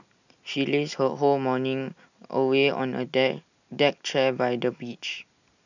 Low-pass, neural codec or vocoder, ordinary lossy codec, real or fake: 7.2 kHz; none; none; real